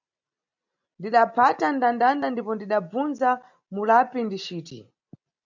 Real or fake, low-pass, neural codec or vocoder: real; 7.2 kHz; none